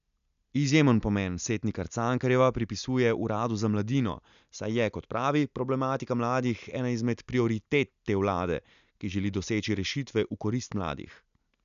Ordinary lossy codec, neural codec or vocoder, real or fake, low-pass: none; none; real; 7.2 kHz